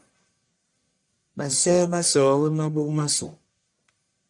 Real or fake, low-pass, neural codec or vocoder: fake; 10.8 kHz; codec, 44.1 kHz, 1.7 kbps, Pupu-Codec